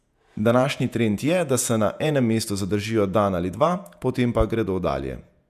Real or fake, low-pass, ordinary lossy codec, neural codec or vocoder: fake; 14.4 kHz; none; vocoder, 48 kHz, 128 mel bands, Vocos